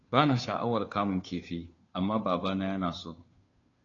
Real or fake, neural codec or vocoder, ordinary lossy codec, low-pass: fake; codec, 16 kHz, 2 kbps, FunCodec, trained on Chinese and English, 25 frames a second; AAC, 32 kbps; 7.2 kHz